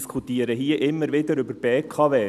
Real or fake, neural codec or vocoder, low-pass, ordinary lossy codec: real; none; 14.4 kHz; none